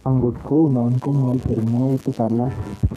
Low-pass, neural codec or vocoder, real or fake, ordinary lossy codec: 14.4 kHz; codec, 32 kHz, 1.9 kbps, SNAC; fake; none